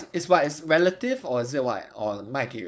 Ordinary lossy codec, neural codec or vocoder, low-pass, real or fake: none; codec, 16 kHz, 4.8 kbps, FACodec; none; fake